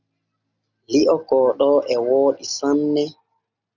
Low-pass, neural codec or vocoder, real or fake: 7.2 kHz; none; real